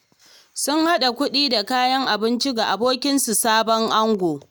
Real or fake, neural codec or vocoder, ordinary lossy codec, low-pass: real; none; none; none